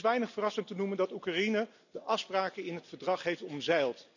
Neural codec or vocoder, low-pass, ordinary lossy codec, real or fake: none; 7.2 kHz; none; real